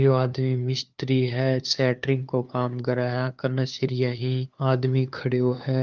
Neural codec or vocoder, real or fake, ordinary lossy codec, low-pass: codec, 16 kHz, 2 kbps, FunCodec, trained on LibriTTS, 25 frames a second; fake; Opus, 24 kbps; 7.2 kHz